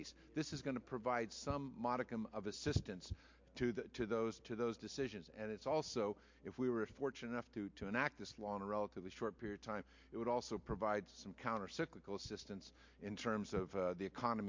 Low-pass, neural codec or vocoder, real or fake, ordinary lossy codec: 7.2 kHz; none; real; MP3, 48 kbps